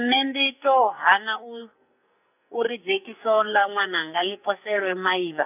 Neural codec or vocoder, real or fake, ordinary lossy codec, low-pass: codec, 44.1 kHz, 2.6 kbps, SNAC; fake; none; 3.6 kHz